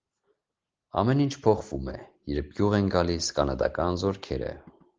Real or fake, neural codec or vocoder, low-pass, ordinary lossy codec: real; none; 7.2 kHz; Opus, 16 kbps